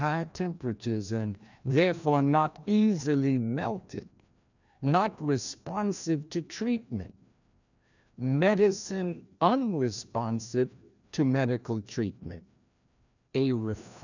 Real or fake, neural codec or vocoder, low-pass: fake; codec, 16 kHz, 1 kbps, FreqCodec, larger model; 7.2 kHz